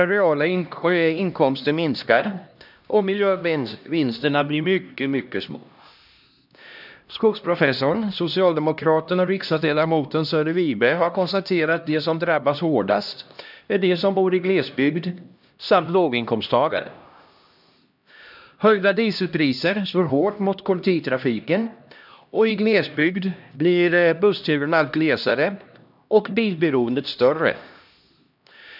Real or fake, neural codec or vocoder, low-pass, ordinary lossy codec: fake; codec, 16 kHz, 1 kbps, X-Codec, HuBERT features, trained on LibriSpeech; 5.4 kHz; none